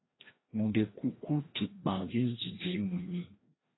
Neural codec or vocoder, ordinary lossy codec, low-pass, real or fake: codec, 16 kHz, 1 kbps, FreqCodec, larger model; AAC, 16 kbps; 7.2 kHz; fake